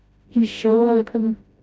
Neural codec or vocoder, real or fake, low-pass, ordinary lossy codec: codec, 16 kHz, 0.5 kbps, FreqCodec, smaller model; fake; none; none